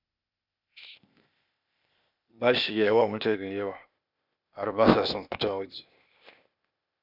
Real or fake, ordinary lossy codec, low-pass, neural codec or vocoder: fake; none; 5.4 kHz; codec, 16 kHz, 0.8 kbps, ZipCodec